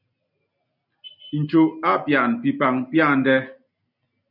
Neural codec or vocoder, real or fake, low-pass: none; real; 5.4 kHz